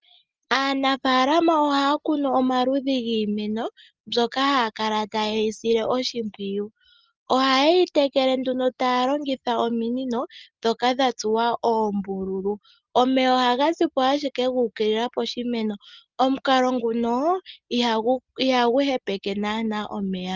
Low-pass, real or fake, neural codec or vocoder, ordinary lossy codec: 7.2 kHz; real; none; Opus, 24 kbps